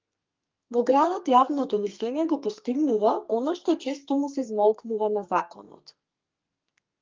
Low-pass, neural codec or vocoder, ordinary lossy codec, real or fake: 7.2 kHz; codec, 32 kHz, 1.9 kbps, SNAC; Opus, 32 kbps; fake